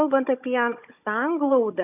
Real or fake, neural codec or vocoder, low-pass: fake; codec, 16 kHz, 16 kbps, FunCodec, trained on Chinese and English, 50 frames a second; 3.6 kHz